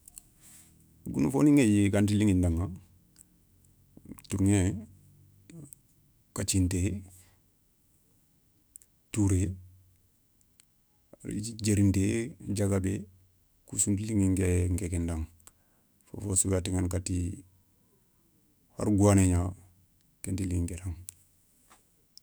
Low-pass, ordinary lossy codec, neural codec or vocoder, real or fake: none; none; autoencoder, 48 kHz, 128 numbers a frame, DAC-VAE, trained on Japanese speech; fake